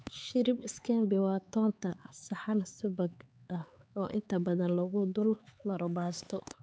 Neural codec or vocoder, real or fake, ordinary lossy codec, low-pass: codec, 16 kHz, 4 kbps, X-Codec, HuBERT features, trained on LibriSpeech; fake; none; none